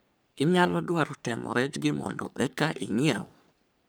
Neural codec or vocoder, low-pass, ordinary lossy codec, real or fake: codec, 44.1 kHz, 3.4 kbps, Pupu-Codec; none; none; fake